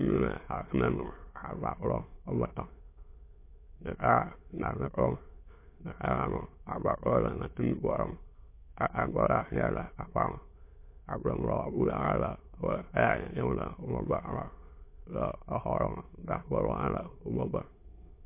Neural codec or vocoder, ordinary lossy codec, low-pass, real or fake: autoencoder, 22.05 kHz, a latent of 192 numbers a frame, VITS, trained on many speakers; MP3, 24 kbps; 3.6 kHz; fake